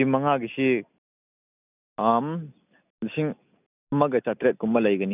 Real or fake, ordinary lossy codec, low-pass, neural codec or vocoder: real; none; 3.6 kHz; none